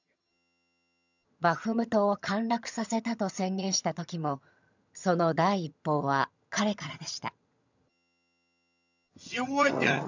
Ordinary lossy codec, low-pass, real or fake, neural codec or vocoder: none; 7.2 kHz; fake; vocoder, 22.05 kHz, 80 mel bands, HiFi-GAN